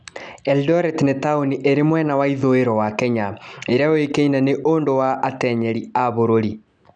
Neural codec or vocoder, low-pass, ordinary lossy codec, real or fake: none; 9.9 kHz; none; real